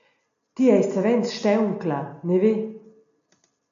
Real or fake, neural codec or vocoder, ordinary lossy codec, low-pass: real; none; AAC, 96 kbps; 7.2 kHz